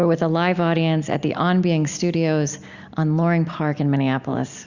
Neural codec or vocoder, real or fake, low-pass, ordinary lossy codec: none; real; 7.2 kHz; Opus, 64 kbps